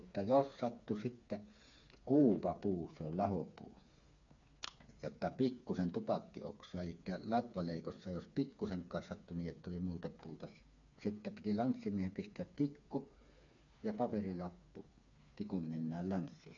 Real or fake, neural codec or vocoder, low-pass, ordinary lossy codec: fake; codec, 16 kHz, 4 kbps, FreqCodec, smaller model; 7.2 kHz; AAC, 64 kbps